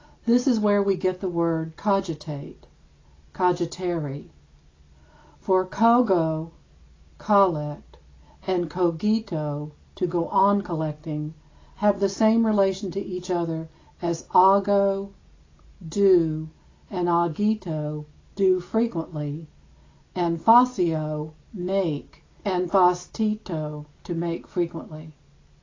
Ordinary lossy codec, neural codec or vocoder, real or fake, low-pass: AAC, 32 kbps; none; real; 7.2 kHz